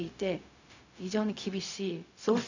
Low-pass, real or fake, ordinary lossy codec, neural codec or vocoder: 7.2 kHz; fake; none; codec, 16 kHz, 0.4 kbps, LongCat-Audio-Codec